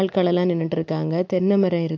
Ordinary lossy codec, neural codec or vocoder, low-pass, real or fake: MP3, 64 kbps; none; 7.2 kHz; real